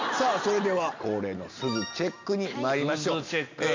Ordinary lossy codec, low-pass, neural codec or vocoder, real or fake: MP3, 64 kbps; 7.2 kHz; none; real